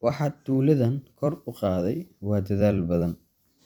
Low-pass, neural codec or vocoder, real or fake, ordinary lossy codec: 19.8 kHz; vocoder, 44.1 kHz, 128 mel bands every 256 samples, BigVGAN v2; fake; none